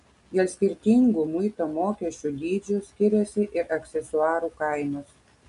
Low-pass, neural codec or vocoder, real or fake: 10.8 kHz; none; real